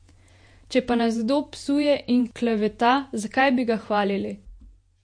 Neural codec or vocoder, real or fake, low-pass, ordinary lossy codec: vocoder, 48 kHz, 128 mel bands, Vocos; fake; 9.9 kHz; MP3, 48 kbps